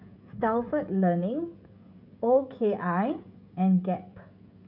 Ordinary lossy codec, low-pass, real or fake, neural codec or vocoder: none; 5.4 kHz; fake; codec, 16 kHz, 16 kbps, FreqCodec, smaller model